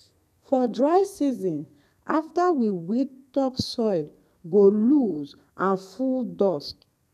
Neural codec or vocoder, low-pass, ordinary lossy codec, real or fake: codec, 32 kHz, 1.9 kbps, SNAC; 14.4 kHz; none; fake